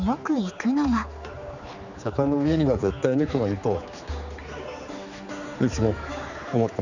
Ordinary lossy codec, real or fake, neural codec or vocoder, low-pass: none; fake; codec, 16 kHz, 4 kbps, X-Codec, HuBERT features, trained on general audio; 7.2 kHz